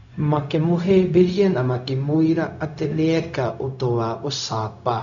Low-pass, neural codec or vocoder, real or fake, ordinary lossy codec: 7.2 kHz; codec, 16 kHz, 0.4 kbps, LongCat-Audio-Codec; fake; none